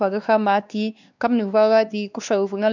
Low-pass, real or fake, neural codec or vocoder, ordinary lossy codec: 7.2 kHz; fake; codec, 16 kHz, 2 kbps, X-Codec, WavLM features, trained on Multilingual LibriSpeech; none